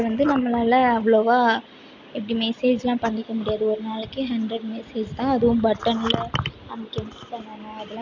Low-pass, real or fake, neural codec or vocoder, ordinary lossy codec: 7.2 kHz; real; none; none